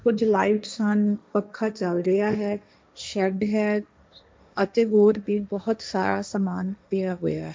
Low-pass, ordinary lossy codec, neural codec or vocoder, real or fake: none; none; codec, 16 kHz, 1.1 kbps, Voila-Tokenizer; fake